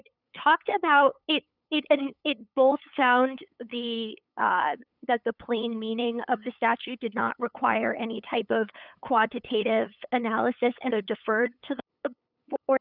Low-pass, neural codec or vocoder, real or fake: 5.4 kHz; codec, 16 kHz, 8 kbps, FunCodec, trained on LibriTTS, 25 frames a second; fake